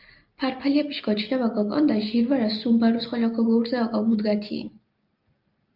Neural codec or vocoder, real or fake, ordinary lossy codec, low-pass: none; real; Opus, 24 kbps; 5.4 kHz